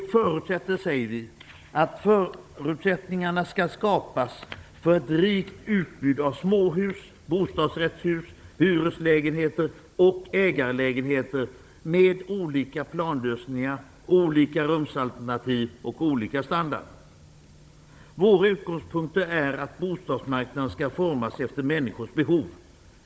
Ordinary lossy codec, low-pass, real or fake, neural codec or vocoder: none; none; fake; codec, 16 kHz, 16 kbps, FunCodec, trained on Chinese and English, 50 frames a second